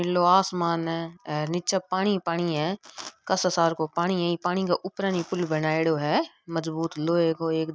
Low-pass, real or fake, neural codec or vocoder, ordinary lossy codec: none; real; none; none